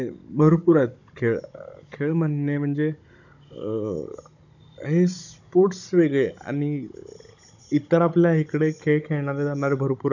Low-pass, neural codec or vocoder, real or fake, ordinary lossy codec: 7.2 kHz; codec, 16 kHz, 16 kbps, FunCodec, trained on Chinese and English, 50 frames a second; fake; none